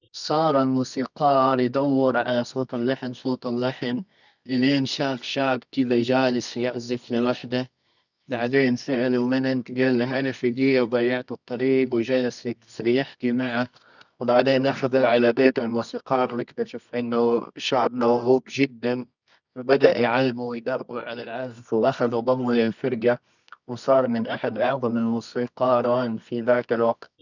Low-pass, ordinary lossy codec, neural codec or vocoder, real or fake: 7.2 kHz; none; codec, 24 kHz, 0.9 kbps, WavTokenizer, medium music audio release; fake